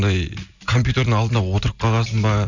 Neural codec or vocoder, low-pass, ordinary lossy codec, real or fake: none; 7.2 kHz; none; real